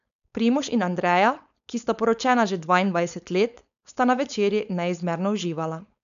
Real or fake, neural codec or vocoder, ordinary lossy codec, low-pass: fake; codec, 16 kHz, 4.8 kbps, FACodec; none; 7.2 kHz